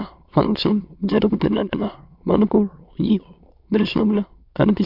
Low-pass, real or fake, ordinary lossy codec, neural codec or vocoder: 5.4 kHz; fake; MP3, 48 kbps; autoencoder, 22.05 kHz, a latent of 192 numbers a frame, VITS, trained on many speakers